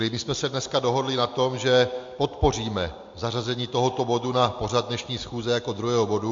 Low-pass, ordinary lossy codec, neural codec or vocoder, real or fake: 7.2 kHz; MP3, 48 kbps; none; real